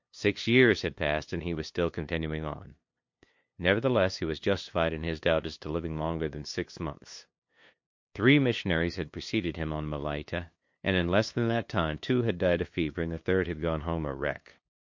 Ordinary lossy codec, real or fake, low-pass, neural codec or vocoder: MP3, 48 kbps; fake; 7.2 kHz; codec, 16 kHz, 2 kbps, FunCodec, trained on LibriTTS, 25 frames a second